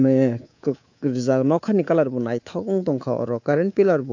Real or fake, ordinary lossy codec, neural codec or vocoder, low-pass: fake; AAC, 48 kbps; codec, 24 kHz, 3.1 kbps, DualCodec; 7.2 kHz